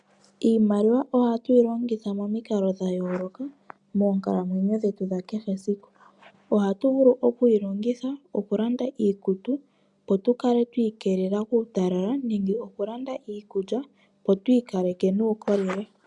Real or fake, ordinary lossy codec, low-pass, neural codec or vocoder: real; MP3, 96 kbps; 10.8 kHz; none